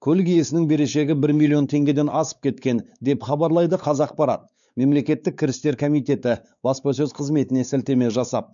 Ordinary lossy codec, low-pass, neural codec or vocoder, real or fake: none; 7.2 kHz; codec, 16 kHz, 4 kbps, X-Codec, WavLM features, trained on Multilingual LibriSpeech; fake